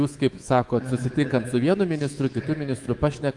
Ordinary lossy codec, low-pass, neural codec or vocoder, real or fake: Opus, 24 kbps; 10.8 kHz; codec, 24 kHz, 3.1 kbps, DualCodec; fake